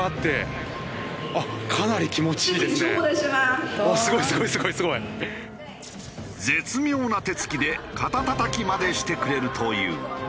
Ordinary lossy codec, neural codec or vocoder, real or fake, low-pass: none; none; real; none